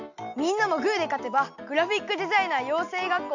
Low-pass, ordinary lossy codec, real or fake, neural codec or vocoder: 7.2 kHz; none; real; none